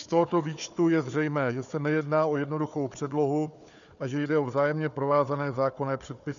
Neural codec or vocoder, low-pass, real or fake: codec, 16 kHz, 4 kbps, FunCodec, trained on Chinese and English, 50 frames a second; 7.2 kHz; fake